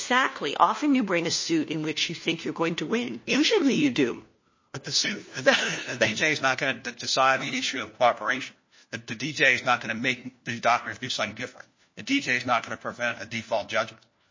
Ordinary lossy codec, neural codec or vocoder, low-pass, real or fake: MP3, 32 kbps; codec, 16 kHz, 1 kbps, FunCodec, trained on LibriTTS, 50 frames a second; 7.2 kHz; fake